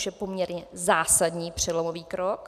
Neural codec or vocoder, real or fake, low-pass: none; real; 14.4 kHz